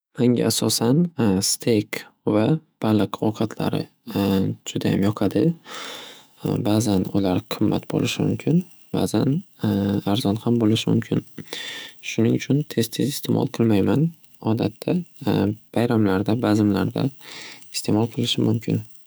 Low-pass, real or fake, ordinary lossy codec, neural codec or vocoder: none; fake; none; autoencoder, 48 kHz, 128 numbers a frame, DAC-VAE, trained on Japanese speech